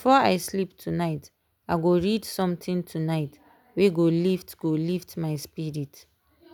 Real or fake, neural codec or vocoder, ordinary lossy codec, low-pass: real; none; none; none